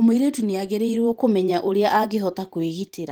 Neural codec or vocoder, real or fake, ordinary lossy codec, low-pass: vocoder, 44.1 kHz, 128 mel bands every 512 samples, BigVGAN v2; fake; Opus, 24 kbps; 19.8 kHz